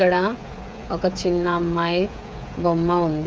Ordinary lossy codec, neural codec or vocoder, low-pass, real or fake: none; codec, 16 kHz, 8 kbps, FreqCodec, smaller model; none; fake